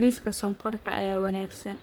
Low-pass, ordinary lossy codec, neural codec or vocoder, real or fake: none; none; codec, 44.1 kHz, 1.7 kbps, Pupu-Codec; fake